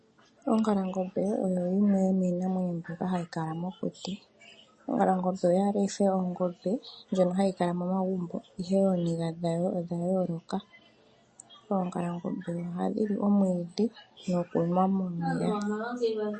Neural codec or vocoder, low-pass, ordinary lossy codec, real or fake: none; 10.8 kHz; MP3, 32 kbps; real